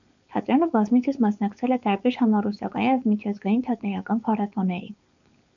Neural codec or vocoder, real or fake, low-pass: codec, 16 kHz, 4.8 kbps, FACodec; fake; 7.2 kHz